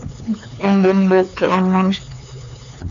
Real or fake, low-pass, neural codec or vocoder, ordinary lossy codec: fake; 7.2 kHz; codec, 16 kHz, 4 kbps, X-Codec, HuBERT features, trained on LibriSpeech; MP3, 64 kbps